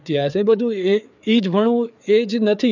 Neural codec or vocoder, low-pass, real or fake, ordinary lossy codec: codec, 16 kHz, 4 kbps, FreqCodec, larger model; 7.2 kHz; fake; none